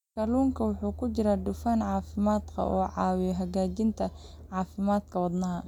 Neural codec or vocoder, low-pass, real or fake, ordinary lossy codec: none; 19.8 kHz; real; none